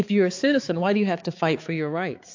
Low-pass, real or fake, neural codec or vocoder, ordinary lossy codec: 7.2 kHz; fake; codec, 16 kHz, 4 kbps, X-Codec, HuBERT features, trained on balanced general audio; AAC, 48 kbps